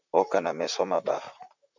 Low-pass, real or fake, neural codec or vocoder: 7.2 kHz; fake; vocoder, 44.1 kHz, 128 mel bands, Pupu-Vocoder